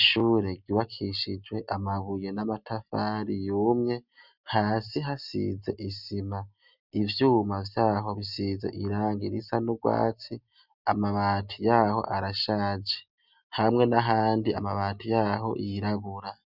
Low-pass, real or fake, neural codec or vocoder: 5.4 kHz; real; none